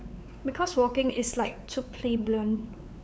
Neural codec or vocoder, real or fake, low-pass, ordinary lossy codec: codec, 16 kHz, 4 kbps, X-Codec, WavLM features, trained on Multilingual LibriSpeech; fake; none; none